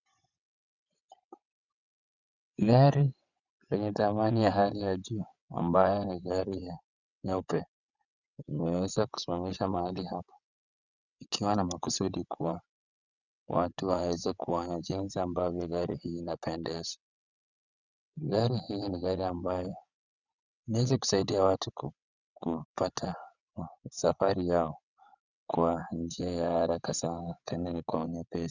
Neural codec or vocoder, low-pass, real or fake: vocoder, 22.05 kHz, 80 mel bands, WaveNeXt; 7.2 kHz; fake